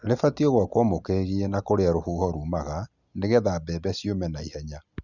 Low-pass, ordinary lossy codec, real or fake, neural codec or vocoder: 7.2 kHz; none; real; none